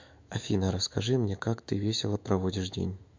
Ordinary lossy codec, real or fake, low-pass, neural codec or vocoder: MP3, 64 kbps; fake; 7.2 kHz; autoencoder, 48 kHz, 128 numbers a frame, DAC-VAE, trained on Japanese speech